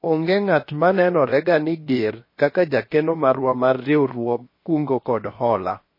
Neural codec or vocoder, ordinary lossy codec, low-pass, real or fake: codec, 16 kHz, 0.7 kbps, FocalCodec; MP3, 24 kbps; 5.4 kHz; fake